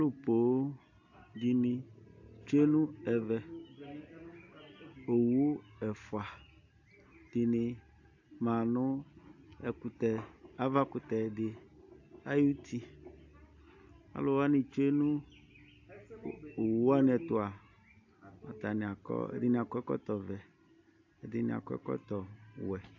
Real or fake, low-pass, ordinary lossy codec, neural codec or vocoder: real; 7.2 kHz; AAC, 48 kbps; none